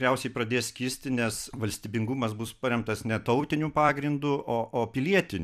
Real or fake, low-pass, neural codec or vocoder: fake; 14.4 kHz; vocoder, 44.1 kHz, 128 mel bands every 256 samples, BigVGAN v2